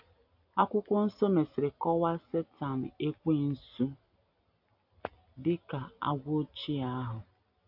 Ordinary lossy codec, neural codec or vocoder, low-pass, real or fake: AAC, 48 kbps; none; 5.4 kHz; real